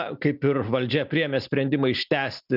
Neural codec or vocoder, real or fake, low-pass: none; real; 5.4 kHz